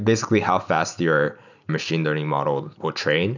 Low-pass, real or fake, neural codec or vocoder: 7.2 kHz; real; none